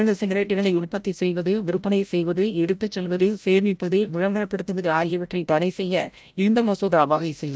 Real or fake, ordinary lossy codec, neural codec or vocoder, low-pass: fake; none; codec, 16 kHz, 0.5 kbps, FreqCodec, larger model; none